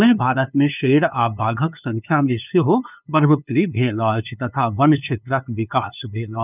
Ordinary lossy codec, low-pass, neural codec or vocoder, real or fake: none; 3.6 kHz; codec, 16 kHz, 2 kbps, FunCodec, trained on LibriTTS, 25 frames a second; fake